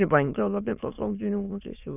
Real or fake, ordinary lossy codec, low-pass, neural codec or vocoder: fake; none; 3.6 kHz; autoencoder, 22.05 kHz, a latent of 192 numbers a frame, VITS, trained on many speakers